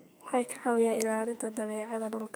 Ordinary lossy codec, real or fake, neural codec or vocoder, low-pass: none; fake; codec, 44.1 kHz, 2.6 kbps, SNAC; none